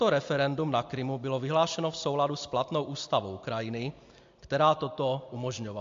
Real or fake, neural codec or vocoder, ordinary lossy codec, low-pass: real; none; MP3, 48 kbps; 7.2 kHz